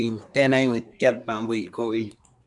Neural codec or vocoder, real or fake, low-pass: codec, 24 kHz, 1 kbps, SNAC; fake; 10.8 kHz